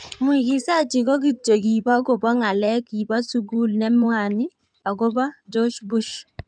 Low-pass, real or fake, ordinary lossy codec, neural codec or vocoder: 9.9 kHz; fake; none; codec, 16 kHz in and 24 kHz out, 2.2 kbps, FireRedTTS-2 codec